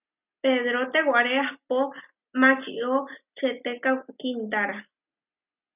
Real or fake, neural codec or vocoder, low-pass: real; none; 3.6 kHz